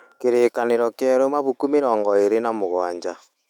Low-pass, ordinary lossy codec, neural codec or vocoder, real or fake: 19.8 kHz; none; autoencoder, 48 kHz, 128 numbers a frame, DAC-VAE, trained on Japanese speech; fake